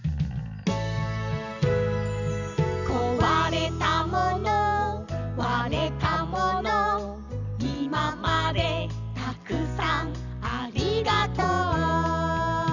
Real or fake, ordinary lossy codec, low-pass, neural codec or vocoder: real; none; 7.2 kHz; none